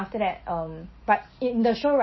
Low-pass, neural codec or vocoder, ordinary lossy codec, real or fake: 7.2 kHz; none; MP3, 24 kbps; real